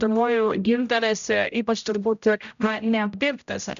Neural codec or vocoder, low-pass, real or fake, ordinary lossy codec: codec, 16 kHz, 0.5 kbps, X-Codec, HuBERT features, trained on general audio; 7.2 kHz; fake; MP3, 96 kbps